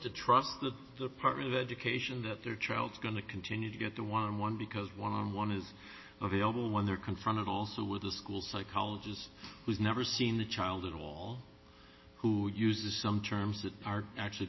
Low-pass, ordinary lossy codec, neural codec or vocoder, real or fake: 7.2 kHz; MP3, 24 kbps; none; real